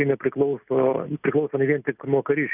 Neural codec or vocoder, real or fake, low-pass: none; real; 3.6 kHz